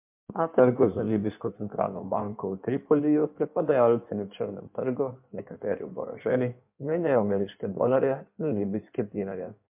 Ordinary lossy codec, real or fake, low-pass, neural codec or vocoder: MP3, 32 kbps; fake; 3.6 kHz; codec, 16 kHz in and 24 kHz out, 1.1 kbps, FireRedTTS-2 codec